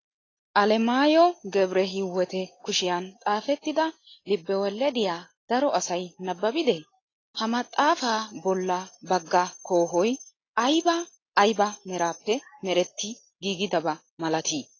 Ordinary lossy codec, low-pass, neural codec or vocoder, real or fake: AAC, 32 kbps; 7.2 kHz; none; real